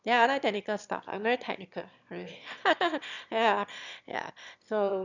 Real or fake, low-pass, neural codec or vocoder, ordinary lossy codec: fake; 7.2 kHz; autoencoder, 22.05 kHz, a latent of 192 numbers a frame, VITS, trained on one speaker; none